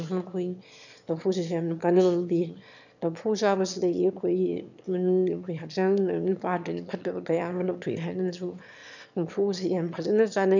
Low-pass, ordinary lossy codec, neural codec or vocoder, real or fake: 7.2 kHz; none; autoencoder, 22.05 kHz, a latent of 192 numbers a frame, VITS, trained on one speaker; fake